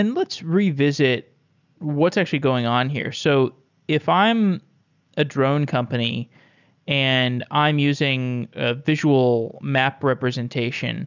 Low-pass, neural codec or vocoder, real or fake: 7.2 kHz; none; real